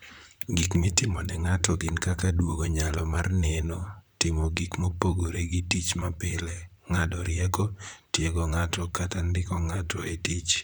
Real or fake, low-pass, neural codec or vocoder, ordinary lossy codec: fake; none; vocoder, 44.1 kHz, 128 mel bands, Pupu-Vocoder; none